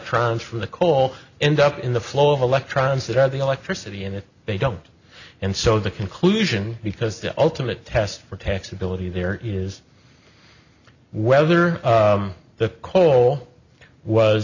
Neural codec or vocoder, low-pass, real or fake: none; 7.2 kHz; real